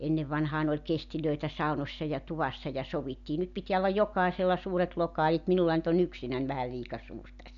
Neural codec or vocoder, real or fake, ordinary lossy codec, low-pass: none; real; none; 7.2 kHz